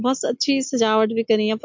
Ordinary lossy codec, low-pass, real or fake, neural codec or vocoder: MP3, 48 kbps; 7.2 kHz; real; none